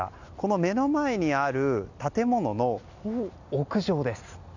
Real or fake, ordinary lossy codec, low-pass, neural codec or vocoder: real; none; 7.2 kHz; none